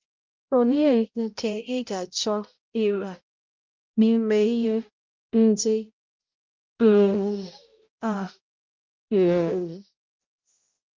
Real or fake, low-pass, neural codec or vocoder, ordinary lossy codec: fake; 7.2 kHz; codec, 16 kHz, 0.5 kbps, X-Codec, HuBERT features, trained on balanced general audio; Opus, 24 kbps